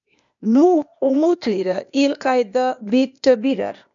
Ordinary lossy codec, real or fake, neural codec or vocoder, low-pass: MP3, 96 kbps; fake; codec, 16 kHz, 0.8 kbps, ZipCodec; 7.2 kHz